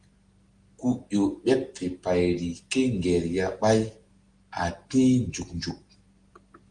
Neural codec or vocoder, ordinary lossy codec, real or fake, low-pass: none; Opus, 24 kbps; real; 9.9 kHz